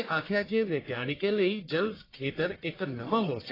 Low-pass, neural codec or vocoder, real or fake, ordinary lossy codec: 5.4 kHz; codec, 44.1 kHz, 1.7 kbps, Pupu-Codec; fake; AAC, 24 kbps